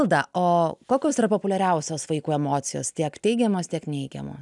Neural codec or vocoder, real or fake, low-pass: none; real; 10.8 kHz